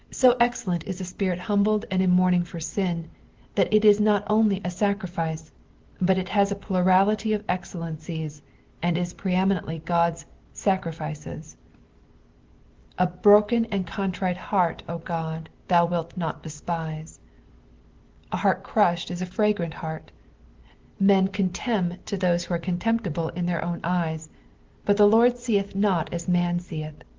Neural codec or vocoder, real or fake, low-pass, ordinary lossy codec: none; real; 7.2 kHz; Opus, 16 kbps